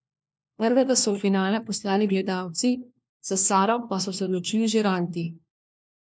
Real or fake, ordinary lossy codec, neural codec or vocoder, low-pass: fake; none; codec, 16 kHz, 1 kbps, FunCodec, trained on LibriTTS, 50 frames a second; none